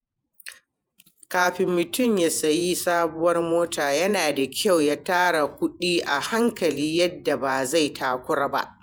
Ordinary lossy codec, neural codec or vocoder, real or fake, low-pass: none; vocoder, 48 kHz, 128 mel bands, Vocos; fake; none